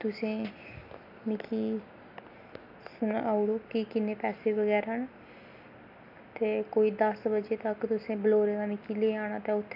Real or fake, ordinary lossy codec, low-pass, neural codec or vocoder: real; AAC, 48 kbps; 5.4 kHz; none